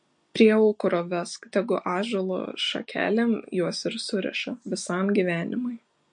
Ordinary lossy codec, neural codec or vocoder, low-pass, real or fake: MP3, 48 kbps; none; 9.9 kHz; real